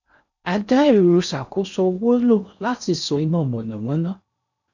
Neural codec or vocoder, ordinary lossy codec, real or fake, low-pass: codec, 16 kHz in and 24 kHz out, 0.6 kbps, FocalCodec, streaming, 4096 codes; none; fake; 7.2 kHz